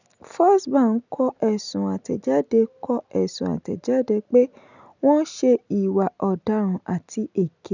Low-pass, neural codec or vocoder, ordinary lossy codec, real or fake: 7.2 kHz; none; none; real